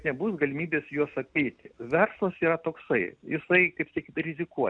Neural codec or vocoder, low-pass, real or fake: none; 9.9 kHz; real